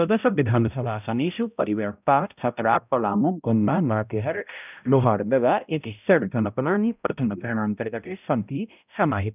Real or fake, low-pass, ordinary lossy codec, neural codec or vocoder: fake; 3.6 kHz; none; codec, 16 kHz, 0.5 kbps, X-Codec, HuBERT features, trained on balanced general audio